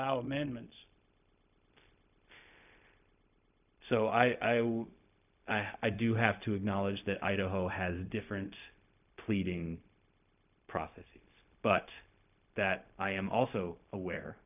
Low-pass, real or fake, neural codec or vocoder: 3.6 kHz; fake; codec, 16 kHz, 0.4 kbps, LongCat-Audio-Codec